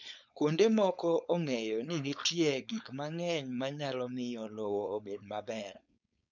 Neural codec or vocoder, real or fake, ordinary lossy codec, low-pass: codec, 16 kHz, 4.8 kbps, FACodec; fake; none; 7.2 kHz